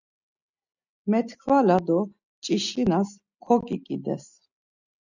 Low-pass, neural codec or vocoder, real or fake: 7.2 kHz; none; real